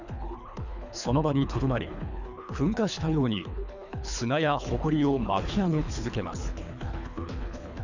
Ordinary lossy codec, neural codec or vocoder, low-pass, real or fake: none; codec, 24 kHz, 3 kbps, HILCodec; 7.2 kHz; fake